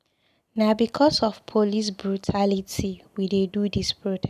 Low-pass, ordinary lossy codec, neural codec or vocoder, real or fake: 14.4 kHz; none; none; real